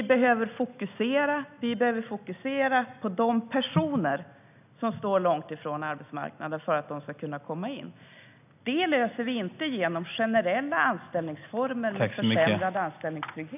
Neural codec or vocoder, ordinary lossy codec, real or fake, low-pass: none; none; real; 3.6 kHz